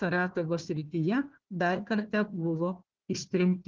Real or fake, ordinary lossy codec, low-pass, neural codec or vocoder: fake; Opus, 16 kbps; 7.2 kHz; codec, 16 kHz, 1 kbps, FunCodec, trained on Chinese and English, 50 frames a second